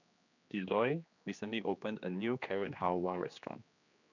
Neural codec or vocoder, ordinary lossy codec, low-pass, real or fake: codec, 16 kHz, 2 kbps, X-Codec, HuBERT features, trained on general audio; none; 7.2 kHz; fake